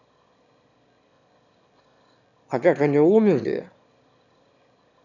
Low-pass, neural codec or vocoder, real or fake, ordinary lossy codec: 7.2 kHz; autoencoder, 22.05 kHz, a latent of 192 numbers a frame, VITS, trained on one speaker; fake; none